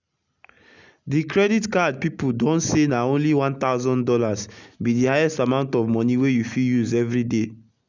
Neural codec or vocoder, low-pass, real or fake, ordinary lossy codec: none; 7.2 kHz; real; none